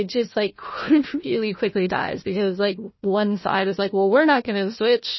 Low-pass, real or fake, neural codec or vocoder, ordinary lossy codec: 7.2 kHz; fake; codec, 16 kHz, 1 kbps, FunCodec, trained on Chinese and English, 50 frames a second; MP3, 24 kbps